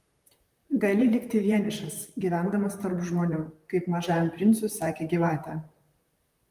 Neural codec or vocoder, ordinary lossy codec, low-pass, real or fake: vocoder, 44.1 kHz, 128 mel bands, Pupu-Vocoder; Opus, 32 kbps; 14.4 kHz; fake